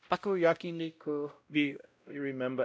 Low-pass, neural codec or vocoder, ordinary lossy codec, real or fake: none; codec, 16 kHz, 0.5 kbps, X-Codec, WavLM features, trained on Multilingual LibriSpeech; none; fake